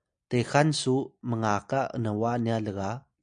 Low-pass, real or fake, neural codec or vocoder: 9.9 kHz; real; none